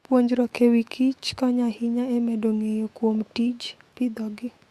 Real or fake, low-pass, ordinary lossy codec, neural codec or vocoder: fake; 14.4 kHz; Opus, 64 kbps; autoencoder, 48 kHz, 128 numbers a frame, DAC-VAE, trained on Japanese speech